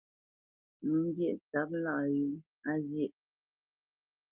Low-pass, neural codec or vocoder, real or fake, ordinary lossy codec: 3.6 kHz; none; real; Opus, 32 kbps